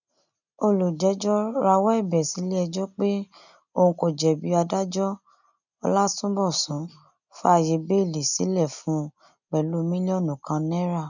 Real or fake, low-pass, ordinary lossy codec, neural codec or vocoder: real; 7.2 kHz; none; none